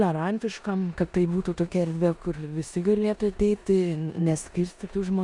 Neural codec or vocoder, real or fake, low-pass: codec, 16 kHz in and 24 kHz out, 0.9 kbps, LongCat-Audio-Codec, four codebook decoder; fake; 10.8 kHz